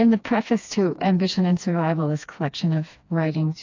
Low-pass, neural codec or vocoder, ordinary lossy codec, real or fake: 7.2 kHz; codec, 16 kHz, 2 kbps, FreqCodec, smaller model; AAC, 48 kbps; fake